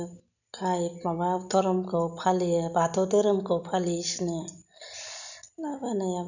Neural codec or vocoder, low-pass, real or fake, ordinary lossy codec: none; 7.2 kHz; real; none